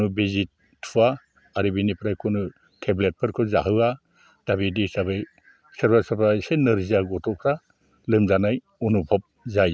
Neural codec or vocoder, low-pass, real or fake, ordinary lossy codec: none; none; real; none